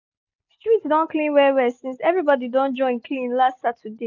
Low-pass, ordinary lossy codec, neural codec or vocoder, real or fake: 7.2 kHz; none; none; real